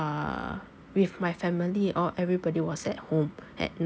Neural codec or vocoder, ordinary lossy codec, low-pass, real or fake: none; none; none; real